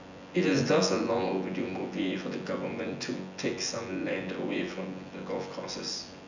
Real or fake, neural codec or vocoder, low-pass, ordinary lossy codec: fake; vocoder, 24 kHz, 100 mel bands, Vocos; 7.2 kHz; none